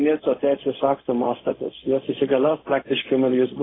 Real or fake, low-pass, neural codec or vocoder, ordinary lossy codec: fake; 7.2 kHz; codec, 16 kHz, 0.4 kbps, LongCat-Audio-Codec; AAC, 16 kbps